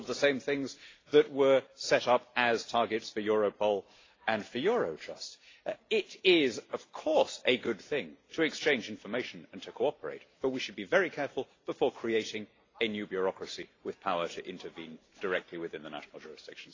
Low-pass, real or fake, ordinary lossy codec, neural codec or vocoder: 7.2 kHz; real; AAC, 32 kbps; none